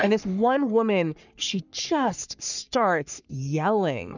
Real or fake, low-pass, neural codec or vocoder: fake; 7.2 kHz; codec, 44.1 kHz, 3.4 kbps, Pupu-Codec